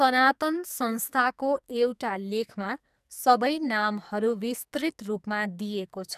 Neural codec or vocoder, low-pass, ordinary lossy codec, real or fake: codec, 44.1 kHz, 2.6 kbps, SNAC; 14.4 kHz; none; fake